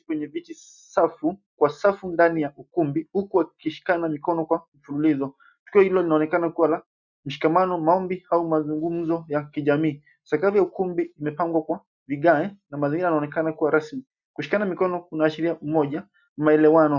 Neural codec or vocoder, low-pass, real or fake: none; 7.2 kHz; real